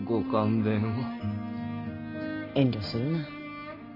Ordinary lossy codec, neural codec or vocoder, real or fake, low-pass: AAC, 24 kbps; none; real; 5.4 kHz